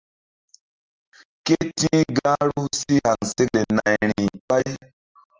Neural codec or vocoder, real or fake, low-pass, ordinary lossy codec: none; real; 7.2 kHz; Opus, 24 kbps